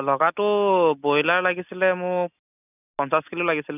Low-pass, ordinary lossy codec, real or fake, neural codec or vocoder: 3.6 kHz; none; real; none